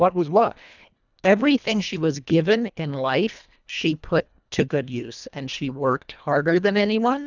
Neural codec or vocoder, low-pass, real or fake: codec, 24 kHz, 1.5 kbps, HILCodec; 7.2 kHz; fake